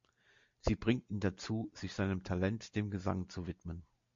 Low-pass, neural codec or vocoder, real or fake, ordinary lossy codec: 7.2 kHz; none; real; MP3, 48 kbps